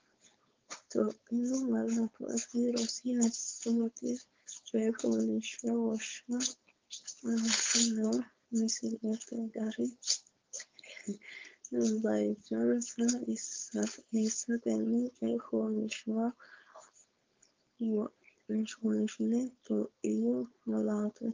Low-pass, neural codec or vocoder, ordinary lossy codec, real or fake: 7.2 kHz; codec, 16 kHz, 4.8 kbps, FACodec; Opus, 32 kbps; fake